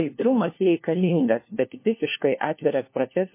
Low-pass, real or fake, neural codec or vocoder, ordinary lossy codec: 3.6 kHz; fake; codec, 16 kHz, 1 kbps, FunCodec, trained on LibriTTS, 50 frames a second; MP3, 24 kbps